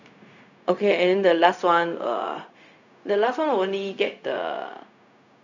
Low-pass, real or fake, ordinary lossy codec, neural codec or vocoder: 7.2 kHz; fake; none; codec, 16 kHz, 0.4 kbps, LongCat-Audio-Codec